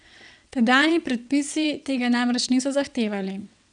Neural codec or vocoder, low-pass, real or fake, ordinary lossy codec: vocoder, 22.05 kHz, 80 mel bands, WaveNeXt; 9.9 kHz; fake; none